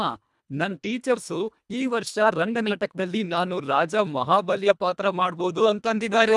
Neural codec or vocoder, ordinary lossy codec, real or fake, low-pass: codec, 24 kHz, 1.5 kbps, HILCodec; none; fake; none